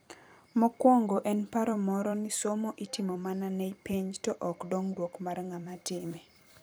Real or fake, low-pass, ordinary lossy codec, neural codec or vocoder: fake; none; none; vocoder, 44.1 kHz, 128 mel bands every 256 samples, BigVGAN v2